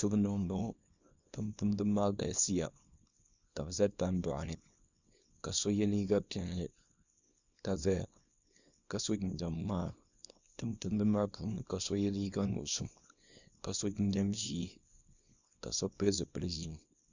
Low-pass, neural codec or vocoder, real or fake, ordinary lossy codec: 7.2 kHz; codec, 24 kHz, 0.9 kbps, WavTokenizer, small release; fake; Opus, 64 kbps